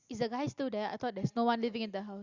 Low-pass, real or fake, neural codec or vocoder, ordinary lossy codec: 7.2 kHz; real; none; Opus, 64 kbps